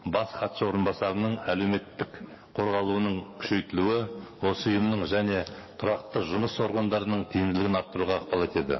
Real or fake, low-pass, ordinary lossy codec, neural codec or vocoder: fake; 7.2 kHz; MP3, 24 kbps; codec, 44.1 kHz, 7.8 kbps, DAC